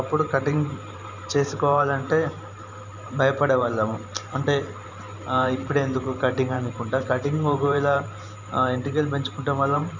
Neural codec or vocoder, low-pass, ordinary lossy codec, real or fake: vocoder, 44.1 kHz, 128 mel bands every 512 samples, BigVGAN v2; 7.2 kHz; none; fake